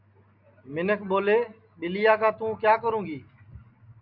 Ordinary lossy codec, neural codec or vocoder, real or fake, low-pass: MP3, 48 kbps; none; real; 5.4 kHz